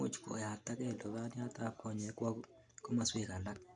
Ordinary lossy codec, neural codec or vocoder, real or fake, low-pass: none; none; real; 9.9 kHz